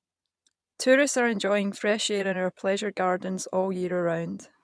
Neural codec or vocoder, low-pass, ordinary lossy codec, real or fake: vocoder, 22.05 kHz, 80 mel bands, Vocos; none; none; fake